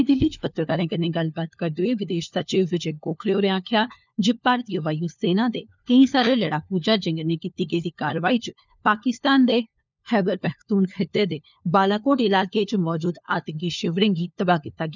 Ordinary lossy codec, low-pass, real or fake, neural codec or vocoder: none; 7.2 kHz; fake; codec, 16 kHz, 4 kbps, FunCodec, trained on LibriTTS, 50 frames a second